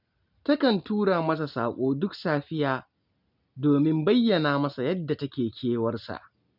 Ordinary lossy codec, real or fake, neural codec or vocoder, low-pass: none; real; none; 5.4 kHz